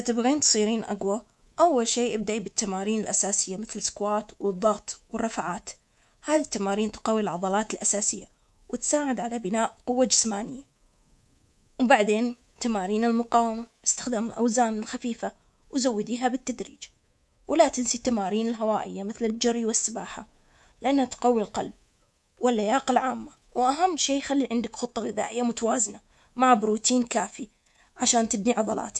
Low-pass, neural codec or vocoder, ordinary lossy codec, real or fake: none; codec, 24 kHz, 3.1 kbps, DualCodec; none; fake